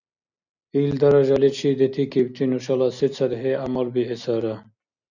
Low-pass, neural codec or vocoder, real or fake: 7.2 kHz; none; real